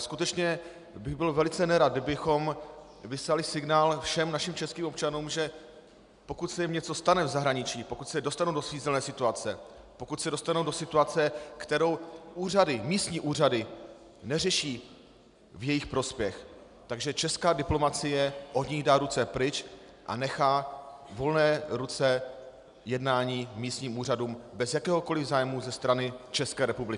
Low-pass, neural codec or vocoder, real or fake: 10.8 kHz; none; real